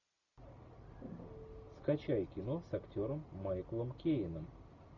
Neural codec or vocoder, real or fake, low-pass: none; real; 7.2 kHz